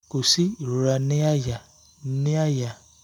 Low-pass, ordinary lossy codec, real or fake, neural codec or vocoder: 19.8 kHz; none; real; none